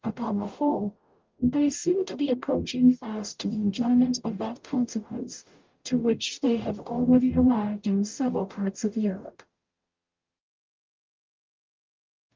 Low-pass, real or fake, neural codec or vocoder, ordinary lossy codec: 7.2 kHz; fake; codec, 44.1 kHz, 0.9 kbps, DAC; Opus, 24 kbps